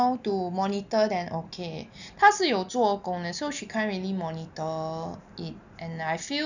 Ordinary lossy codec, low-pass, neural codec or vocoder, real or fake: none; 7.2 kHz; none; real